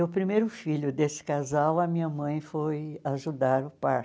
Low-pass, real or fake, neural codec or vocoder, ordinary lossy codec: none; real; none; none